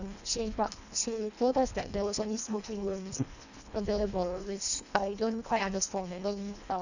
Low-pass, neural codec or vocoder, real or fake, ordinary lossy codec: 7.2 kHz; codec, 24 kHz, 1.5 kbps, HILCodec; fake; none